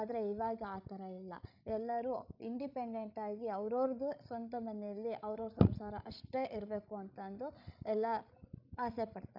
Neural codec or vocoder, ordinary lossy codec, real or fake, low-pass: codec, 16 kHz, 16 kbps, FreqCodec, larger model; none; fake; 5.4 kHz